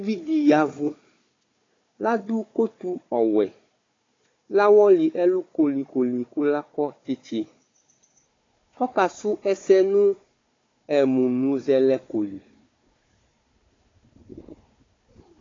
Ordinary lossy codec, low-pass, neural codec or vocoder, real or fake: AAC, 32 kbps; 7.2 kHz; codec, 16 kHz, 4 kbps, FunCodec, trained on Chinese and English, 50 frames a second; fake